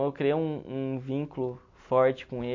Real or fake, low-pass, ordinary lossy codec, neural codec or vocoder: real; 7.2 kHz; MP3, 48 kbps; none